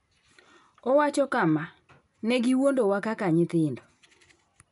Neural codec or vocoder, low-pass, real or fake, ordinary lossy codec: none; 10.8 kHz; real; none